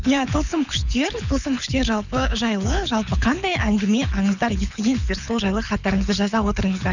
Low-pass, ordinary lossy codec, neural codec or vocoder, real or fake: 7.2 kHz; none; codec, 24 kHz, 6 kbps, HILCodec; fake